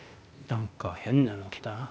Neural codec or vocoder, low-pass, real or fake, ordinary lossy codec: codec, 16 kHz, 0.8 kbps, ZipCodec; none; fake; none